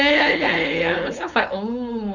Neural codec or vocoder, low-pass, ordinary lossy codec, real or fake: codec, 16 kHz, 4.8 kbps, FACodec; 7.2 kHz; none; fake